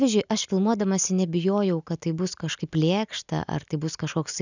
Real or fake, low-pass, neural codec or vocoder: real; 7.2 kHz; none